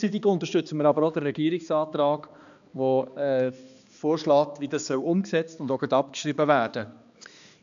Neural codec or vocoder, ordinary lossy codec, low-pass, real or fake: codec, 16 kHz, 2 kbps, X-Codec, HuBERT features, trained on balanced general audio; AAC, 96 kbps; 7.2 kHz; fake